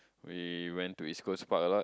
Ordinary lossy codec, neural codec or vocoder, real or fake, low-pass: none; none; real; none